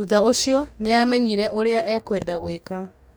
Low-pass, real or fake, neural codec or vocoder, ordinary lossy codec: none; fake; codec, 44.1 kHz, 2.6 kbps, DAC; none